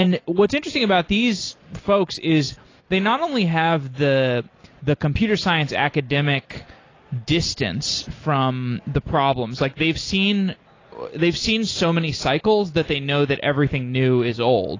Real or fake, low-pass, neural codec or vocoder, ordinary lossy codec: real; 7.2 kHz; none; AAC, 32 kbps